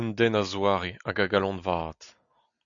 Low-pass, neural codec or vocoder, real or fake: 7.2 kHz; none; real